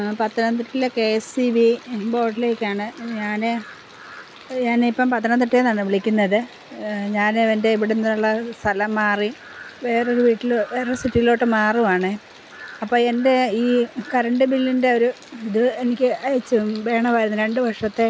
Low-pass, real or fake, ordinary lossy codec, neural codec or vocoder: none; real; none; none